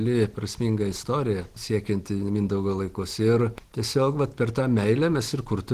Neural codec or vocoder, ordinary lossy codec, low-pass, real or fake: none; Opus, 16 kbps; 14.4 kHz; real